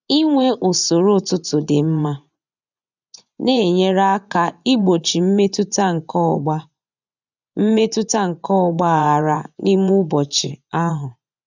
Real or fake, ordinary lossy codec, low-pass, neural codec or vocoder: fake; none; 7.2 kHz; vocoder, 24 kHz, 100 mel bands, Vocos